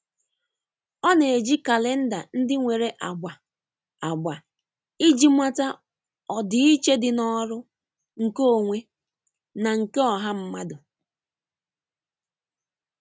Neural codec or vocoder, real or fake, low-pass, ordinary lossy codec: none; real; none; none